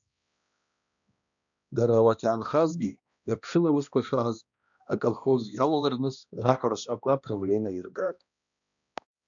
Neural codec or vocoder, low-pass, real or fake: codec, 16 kHz, 1 kbps, X-Codec, HuBERT features, trained on balanced general audio; 7.2 kHz; fake